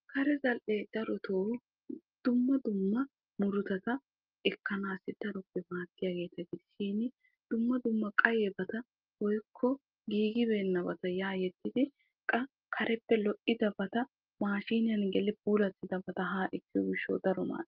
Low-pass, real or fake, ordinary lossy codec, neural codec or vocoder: 5.4 kHz; real; Opus, 32 kbps; none